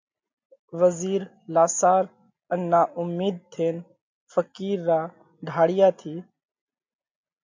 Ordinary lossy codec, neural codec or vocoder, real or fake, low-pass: MP3, 64 kbps; none; real; 7.2 kHz